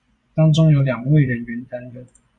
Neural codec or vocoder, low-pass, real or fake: vocoder, 24 kHz, 100 mel bands, Vocos; 10.8 kHz; fake